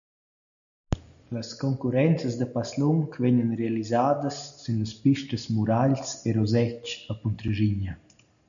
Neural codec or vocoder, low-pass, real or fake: none; 7.2 kHz; real